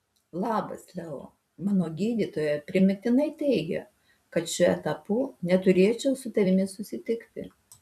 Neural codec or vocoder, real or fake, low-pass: vocoder, 44.1 kHz, 128 mel bands every 256 samples, BigVGAN v2; fake; 14.4 kHz